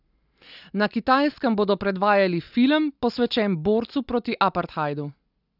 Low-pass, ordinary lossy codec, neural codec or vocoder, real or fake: 5.4 kHz; none; none; real